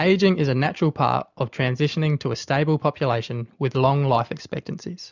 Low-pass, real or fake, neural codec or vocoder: 7.2 kHz; real; none